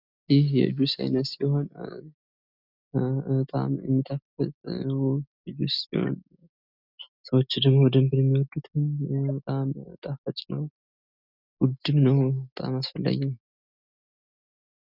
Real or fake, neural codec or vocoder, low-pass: real; none; 5.4 kHz